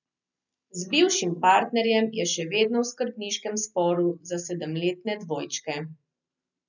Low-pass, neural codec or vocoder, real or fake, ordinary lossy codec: 7.2 kHz; none; real; none